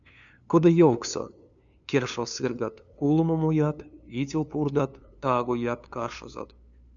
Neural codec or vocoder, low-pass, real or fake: codec, 16 kHz, 2 kbps, FunCodec, trained on LibriTTS, 25 frames a second; 7.2 kHz; fake